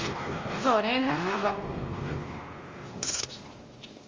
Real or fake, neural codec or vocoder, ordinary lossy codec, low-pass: fake; codec, 16 kHz, 1 kbps, X-Codec, WavLM features, trained on Multilingual LibriSpeech; Opus, 32 kbps; 7.2 kHz